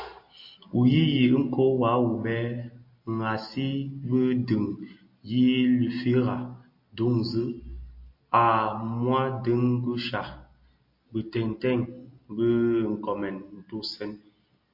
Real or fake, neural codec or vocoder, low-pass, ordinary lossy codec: real; none; 5.4 kHz; MP3, 32 kbps